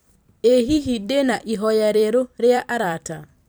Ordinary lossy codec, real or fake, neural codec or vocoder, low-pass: none; fake; vocoder, 44.1 kHz, 128 mel bands every 256 samples, BigVGAN v2; none